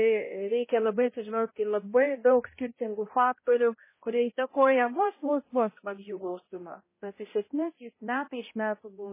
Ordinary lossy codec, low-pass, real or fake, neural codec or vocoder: MP3, 16 kbps; 3.6 kHz; fake; codec, 16 kHz, 0.5 kbps, X-Codec, HuBERT features, trained on balanced general audio